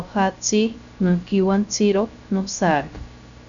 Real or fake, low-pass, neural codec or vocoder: fake; 7.2 kHz; codec, 16 kHz, 0.3 kbps, FocalCodec